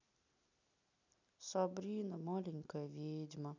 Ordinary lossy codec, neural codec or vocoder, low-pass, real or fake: none; none; 7.2 kHz; real